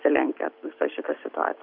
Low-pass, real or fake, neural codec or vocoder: 5.4 kHz; real; none